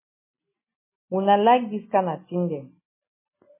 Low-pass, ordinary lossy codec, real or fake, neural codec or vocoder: 3.6 kHz; MP3, 16 kbps; real; none